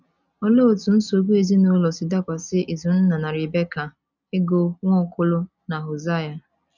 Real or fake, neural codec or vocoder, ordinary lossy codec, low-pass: real; none; none; 7.2 kHz